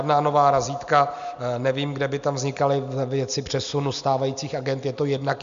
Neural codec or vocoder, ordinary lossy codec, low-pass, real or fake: none; MP3, 64 kbps; 7.2 kHz; real